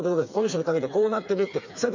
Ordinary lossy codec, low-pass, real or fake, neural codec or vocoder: MP3, 64 kbps; 7.2 kHz; fake; codec, 16 kHz, 4 kbps, FreqCodec, smaller model